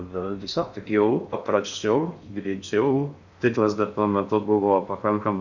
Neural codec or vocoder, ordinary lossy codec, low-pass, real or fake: codec, 16 kHz in and 24 kHz out, 0.6 kbps, FocalCodec, streaming, 4096 codes; Opus, 64 kbps; 7.2 kHz; fake